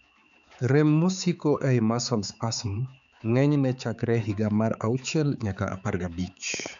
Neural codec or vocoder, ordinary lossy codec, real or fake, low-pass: codec, 16 kHz, 4 kbps, X-Codec, HuBERT features, trained on balanced general audio; none; fake; 7.2 kHz